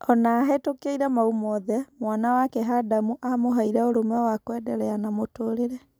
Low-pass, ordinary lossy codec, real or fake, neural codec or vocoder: none; none; real; none